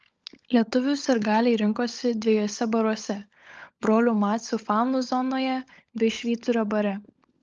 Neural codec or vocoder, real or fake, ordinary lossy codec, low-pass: codec, 16 kHz, 16 kbps, FunCodec, trained on LibriTTS, 50 frames a second; fake; Opus, 24 kbps; 7.2 kHz